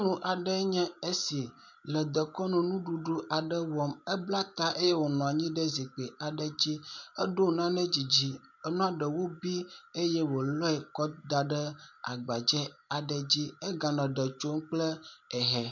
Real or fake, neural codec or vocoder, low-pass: real; none; 7.2 kHz